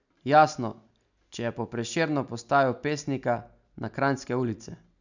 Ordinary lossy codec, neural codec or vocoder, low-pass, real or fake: none; none; 7.2 kHz; real